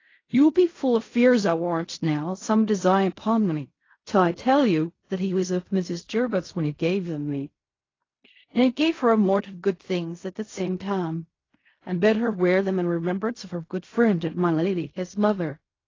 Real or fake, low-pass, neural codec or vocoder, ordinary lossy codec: fake; 7.2 kHz; codec, 16 kHz in and 24 kHz out, 0.4 kbps, LongCat-Audio-Codec, fine tuned four codebook decoder; AAC, 32 kbps